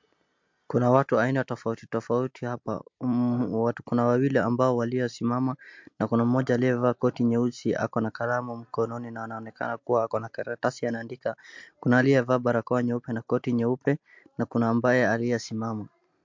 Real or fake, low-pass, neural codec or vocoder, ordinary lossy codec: real; 7.2 kHz; none; MP3, 48 kbps